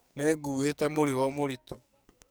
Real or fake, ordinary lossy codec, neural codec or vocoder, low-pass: fake; none; codec, 44.1 kHz, 2.6 kbps, SNAC; none